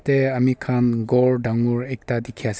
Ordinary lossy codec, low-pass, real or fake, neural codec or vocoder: none; none; real; none